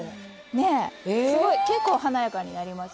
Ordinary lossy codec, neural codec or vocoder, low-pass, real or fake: none; none; none; real